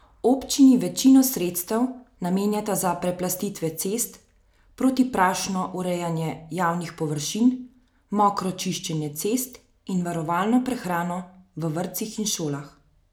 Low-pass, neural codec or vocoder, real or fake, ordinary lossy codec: none; none; real; none